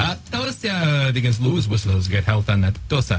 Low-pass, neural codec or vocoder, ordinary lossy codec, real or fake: none; codec, 16 kHz, 0.4 kbps, LongCat-Audio-Codec; none; fake